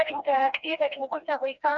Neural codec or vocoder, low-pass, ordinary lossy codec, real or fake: codec, 16 kHz, 2 kbps, FreqCodec, smaller model; 7.2 kHz; MP3, 64 kbps; fake